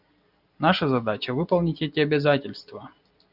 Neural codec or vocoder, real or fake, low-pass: none; real; 5.4 kHz